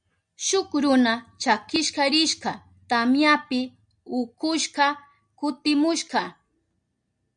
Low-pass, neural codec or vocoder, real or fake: 9.9 kHz; none; real